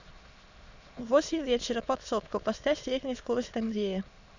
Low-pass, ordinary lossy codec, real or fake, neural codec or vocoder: 7.2 kHz; Opus, 64 kbps; fake; autoencoder, 22.05 kHz, a latent of 192 numbers a frame, VITS, trained on many speakers